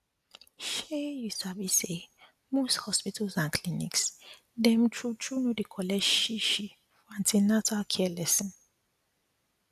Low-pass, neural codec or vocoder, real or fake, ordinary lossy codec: 14.4 kHz; none; real; AAC, 96 kbps